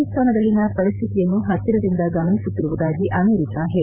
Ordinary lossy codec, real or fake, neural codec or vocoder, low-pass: none; fake; codec, 16 kHz, 8 kbps, FreqCodec, larger model; 3.6 kHz